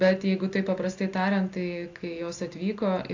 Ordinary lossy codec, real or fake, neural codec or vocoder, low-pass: AAC, 48 kbps; real; none; 7.2 kHz